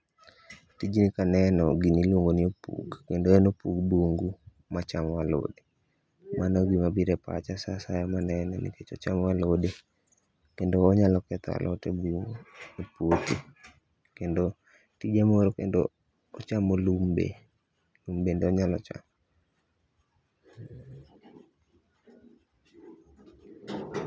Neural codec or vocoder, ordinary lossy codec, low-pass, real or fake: none; none; none; real